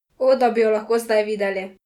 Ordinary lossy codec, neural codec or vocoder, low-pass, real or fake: Opus, 64 kbps; none; 19.8 kHz; real